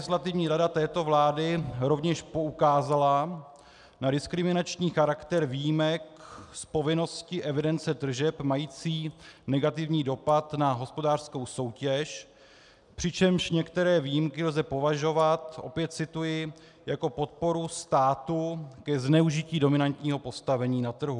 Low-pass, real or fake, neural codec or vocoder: 10.8 kHz; real; none